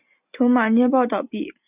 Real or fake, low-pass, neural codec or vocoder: real; 3.6 kHz; none